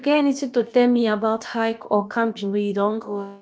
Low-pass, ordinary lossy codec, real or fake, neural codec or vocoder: none; none; fake; codec, 16 kHz, about 1 kbps, DyCAST, with the encoder's durations